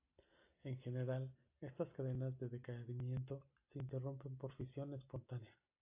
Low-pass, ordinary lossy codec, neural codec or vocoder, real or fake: 3.6 kHz; AAC, 32 kbps; none; real